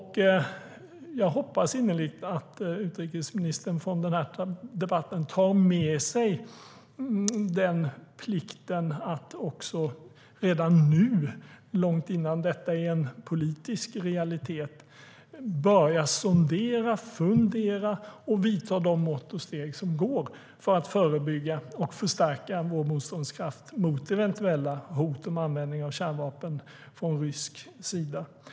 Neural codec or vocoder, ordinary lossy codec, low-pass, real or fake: none; none; none; real